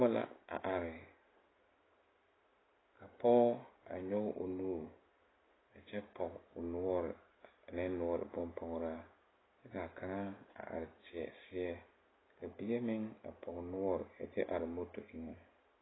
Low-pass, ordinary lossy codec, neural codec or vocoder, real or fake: 7.2 kHz; AAC, 16 kbps; none; real